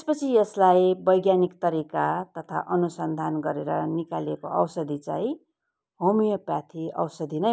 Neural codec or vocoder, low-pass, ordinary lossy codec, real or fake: none; none; none; real